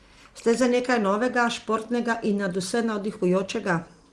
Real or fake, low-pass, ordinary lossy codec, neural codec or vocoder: real; 10.8 kHz; Opus, 24 kbps; none